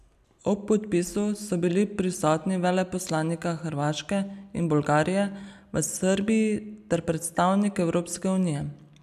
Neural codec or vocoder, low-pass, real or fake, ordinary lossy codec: none; 14.4 kHz; real; none